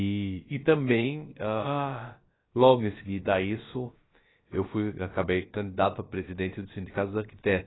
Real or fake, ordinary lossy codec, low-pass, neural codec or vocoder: fake; AAC, 16 kbps; 7.2 kHz; codec, 16 kHz, about 1 kbps, DyCAST, with the encoder's durations